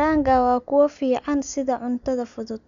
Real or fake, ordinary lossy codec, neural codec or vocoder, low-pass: real; none; none; 7.2 kHz